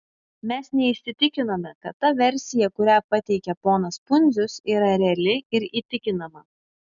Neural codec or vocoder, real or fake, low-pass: none; real; 7.2 kHz